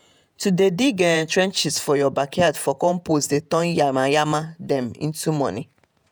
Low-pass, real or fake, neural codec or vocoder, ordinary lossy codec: none; fake; vocoder, 48 kHz, 128 mel bands, Vocos; none